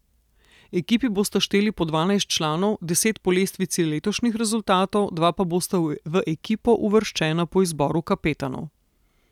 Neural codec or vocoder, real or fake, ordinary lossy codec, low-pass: none; real; none; 19.8 kHz